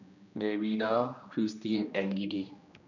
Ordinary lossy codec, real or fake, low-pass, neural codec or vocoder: none; fake; 7.2 kHz; codec, 16 kHz, 1 kbps, X-Codec, HuBERT features, trained on general audio